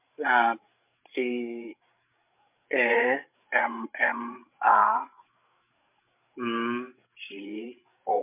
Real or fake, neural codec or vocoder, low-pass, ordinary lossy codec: fake; codec, 16 kHz, 8 kbps, FreqCodec, larger model; 3.6 kHz; MP3, 32 kbps